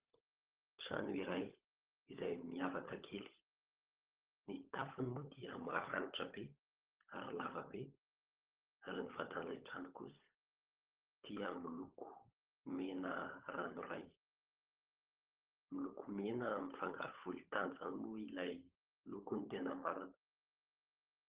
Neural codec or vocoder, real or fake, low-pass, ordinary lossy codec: codec, 16 kHz, 16 kbps, FunCodec, trained on LibriTTS, 50 frames a second; fake; 3.6 kHz; Opus, 16 kbps